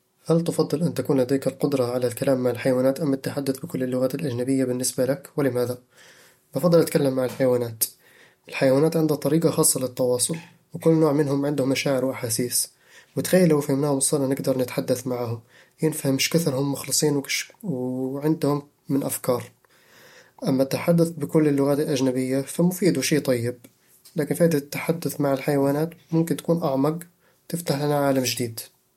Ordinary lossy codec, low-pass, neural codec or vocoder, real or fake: MP3, 64 kbps; 19.8 kHz; none; real